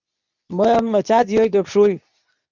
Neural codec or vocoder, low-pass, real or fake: codec, 24 kHz, 0.9 kbps, WavTokenizer, medium speech release version 2; 7.2 kHz; fake